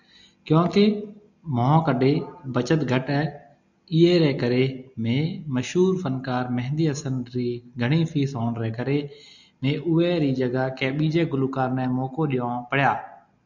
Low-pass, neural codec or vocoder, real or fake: 7.2 kHz; none; real